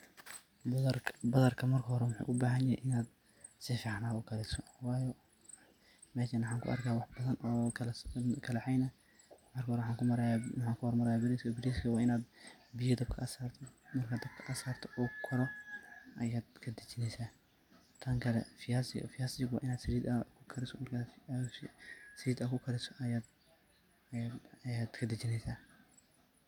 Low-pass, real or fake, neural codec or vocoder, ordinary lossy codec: 19.8 kHz; real; none; none